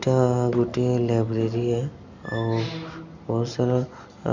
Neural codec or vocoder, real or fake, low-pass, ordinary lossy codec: none; real; 7.2 kHz; none